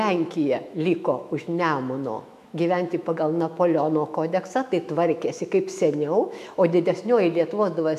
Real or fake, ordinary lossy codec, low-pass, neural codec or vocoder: fake; MP3, 96 kbps; 14.4 kHz; autoencoder, 48 kHz, 128 numbers a frame, DAC-VAE, trained on Japanese speech